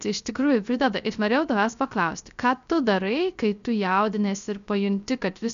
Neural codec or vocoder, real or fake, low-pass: codec, 16 kHz, 0.3 kbps, FocalCodec; fake; 7.2 kHz